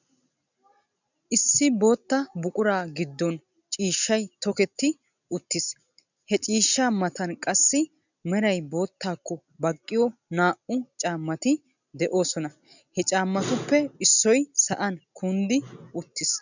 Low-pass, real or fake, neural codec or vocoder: 7.2 kHz; real; none